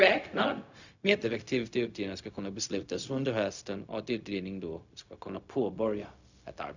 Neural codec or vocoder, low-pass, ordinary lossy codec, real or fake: codec, 16 kHz, 0.4 kbps, LongCat-Audio-Codec; 7.2 kHz; none; fake